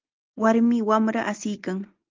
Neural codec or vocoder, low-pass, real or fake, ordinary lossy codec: none; 7.2 kHz; real; Opus, 24 kbps